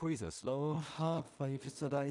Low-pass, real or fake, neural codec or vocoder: 10.8 kHz; fake; codec, 16 kHz in and 24 kHz out, 0.4 kbps, LongCat-Audio-Codec, two codebook decoder